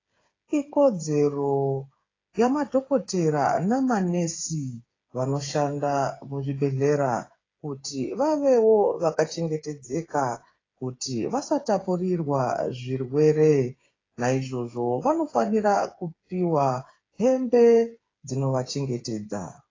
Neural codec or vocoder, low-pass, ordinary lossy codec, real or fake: codec, 16 kHz, 8 kbps, FreqCodec, smaller model; 7.2 kHz; AAC, 32 kbps; fake